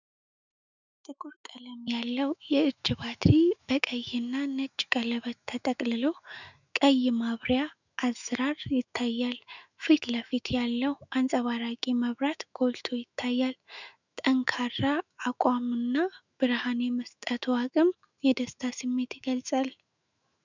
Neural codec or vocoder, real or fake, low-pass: autoencoder, 48 kHz, 128 numbers a frame, DAC-VAE, trained on Japanese speech; fake; 7.2 kHz